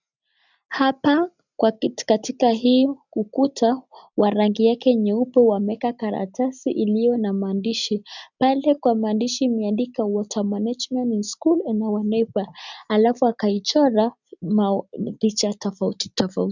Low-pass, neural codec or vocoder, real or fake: 7.2 kHz; none; real